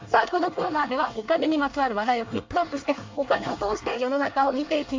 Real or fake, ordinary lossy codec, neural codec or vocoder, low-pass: fake; MP3, 48 kbps; codec, 24 kHz, 1 kbps, SNAC; 7.2 kHz